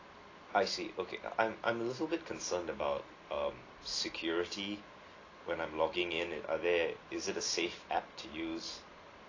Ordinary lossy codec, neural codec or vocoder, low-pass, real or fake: AAC, 32 kbps; none; 7.2 kHz; real